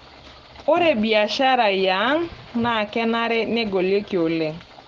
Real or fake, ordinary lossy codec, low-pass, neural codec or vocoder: real; Opus, 16 kbps; 7.2 kHz; none